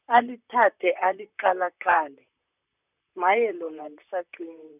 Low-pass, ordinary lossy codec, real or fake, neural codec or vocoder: 3.6 kHz; none; fake; vocoder, 44.1 kHz, 128 mel bands, Pupu-Vocoder